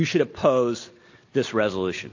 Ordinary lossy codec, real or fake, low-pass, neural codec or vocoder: AAC, 48 kbps; real; 7.2 kHz; none